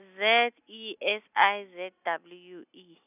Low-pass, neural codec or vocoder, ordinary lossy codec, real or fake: 3.6 kHz; none; none; real